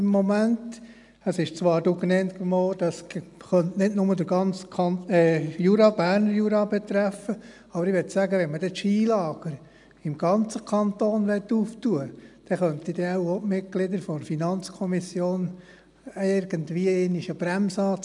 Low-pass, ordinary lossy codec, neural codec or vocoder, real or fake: 10.8 kHz; none; none; real